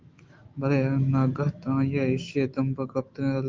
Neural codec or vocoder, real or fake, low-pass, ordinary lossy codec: autoencoder, 48 kHz, 128 numbers a frame, DAC-VAE, trained on Japanese speech; fake; 7.2 kHz; Opus, 32 kbps